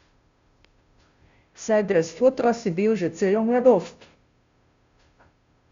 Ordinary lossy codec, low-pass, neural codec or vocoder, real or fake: Opus, 64 kbps; 7.2 kHz; codec, 16 kHz, 0.5 kbps, FunCodec, trained on Chinese and English, 25 frames a second; fake